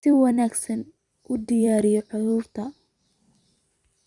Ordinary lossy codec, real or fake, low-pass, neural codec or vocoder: Opus, 64 kbps; fake; 10.8 kHz; vocoder, 44.1 kHz, 128 mel bands every 256 samples, BigVGAN v2